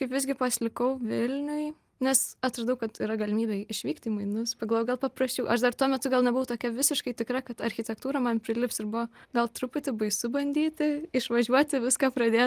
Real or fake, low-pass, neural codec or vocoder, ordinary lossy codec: real; 14.4 kHz; none; Opus, 32 kbps